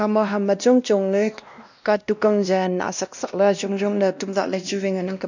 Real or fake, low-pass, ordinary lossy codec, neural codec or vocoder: fake; 7.2 kHz; none; codec, 16 kHz, 1 kbps, X-Codec, WavLM features, trained on Multilingual LibriSpeech